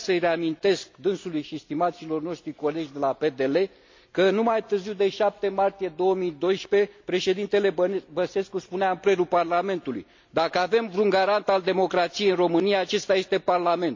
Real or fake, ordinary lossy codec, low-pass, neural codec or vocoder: real; MP3, 48 kbps; 7.2 kHz; none